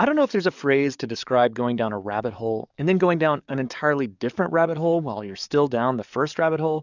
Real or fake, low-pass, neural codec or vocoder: fake; 7.2 kHz; codec, 44.1 kHz, 7.8 kbps, DAC